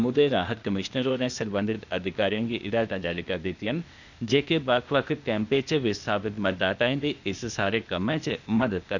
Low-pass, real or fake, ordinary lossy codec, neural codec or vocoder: 7.2 kHz; fake; none; codec, 16 kHz, 0.8 kbps, ZipCodec